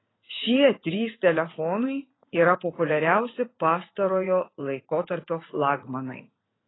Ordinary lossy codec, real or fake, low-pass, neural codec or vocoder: AAC, 16 kbps; fake; 7.2 kHz; vocoder, 44.1 kHz, 80 mel bands, Vocos